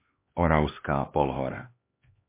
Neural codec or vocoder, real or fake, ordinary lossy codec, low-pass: codec, 16 kHz, 2 kbps, X-Codec, HuBERT features, trained on LibriSpeech; fake; MP3, 24 kbps; 3.6 kHz